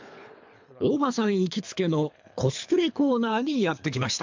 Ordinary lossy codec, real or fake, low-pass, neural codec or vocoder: MP3, 64 kbps; fake; 7.2 kHz; codec, 24 kHz, 3 kbps, HILCodec